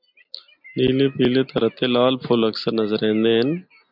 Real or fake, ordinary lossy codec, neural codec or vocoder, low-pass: real; MP3, 48 kbps; none; 5.4 kHz